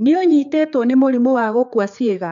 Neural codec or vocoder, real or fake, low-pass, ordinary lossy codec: codec, 16 kHz, 4 kbps, X-Codec, HuBERT features, trained on general audio; fake; 7.2 kHz; none